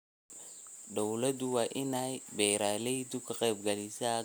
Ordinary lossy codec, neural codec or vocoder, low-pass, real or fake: none; none; none; real